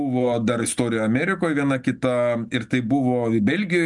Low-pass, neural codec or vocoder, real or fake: 10.8 kHz; none; real